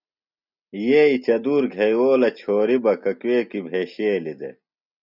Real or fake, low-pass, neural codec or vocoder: real; 5.4 kHz; none